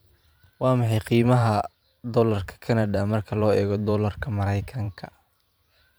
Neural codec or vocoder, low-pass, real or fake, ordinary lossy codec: vocoder, 44.1 kHz, 128 mel bands every 512 samples, BigVGAN v2; none; fake; none